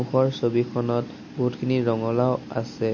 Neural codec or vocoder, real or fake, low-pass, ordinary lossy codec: none; real; 7.2 kHz; MP3, 32 kbps